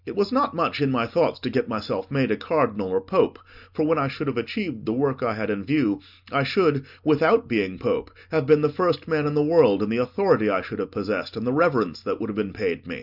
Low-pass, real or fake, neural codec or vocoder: 5.4 kHz; real; none